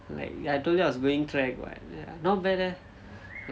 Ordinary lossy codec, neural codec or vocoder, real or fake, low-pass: none; none; real; none